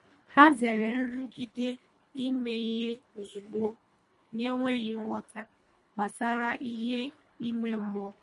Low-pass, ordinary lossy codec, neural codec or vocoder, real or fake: 10.8 kHz; MP3, 48 kbps; codec, 24 kHz, 1.5 kbps, HILCodec; fake